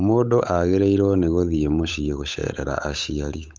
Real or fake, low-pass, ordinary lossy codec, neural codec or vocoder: fake; none; none; codec, 16 kHz, 8 kbps, FunCodec, trained on Chinese and English, 25 frames a second